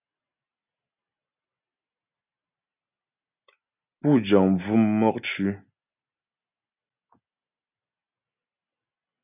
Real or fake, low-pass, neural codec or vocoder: real; 3.6 kHz; none